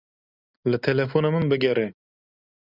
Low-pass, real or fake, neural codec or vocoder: 5.4 kHz; real; none